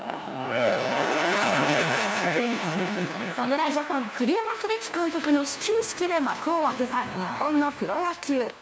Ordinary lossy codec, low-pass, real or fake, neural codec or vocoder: none; none; fake; codec, 16 kHz, 1 kbps, FunCodec, trained on LibriTTS, 50 frames a second